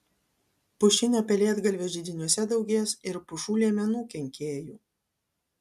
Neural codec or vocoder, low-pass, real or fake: none; 14.4 kHz; real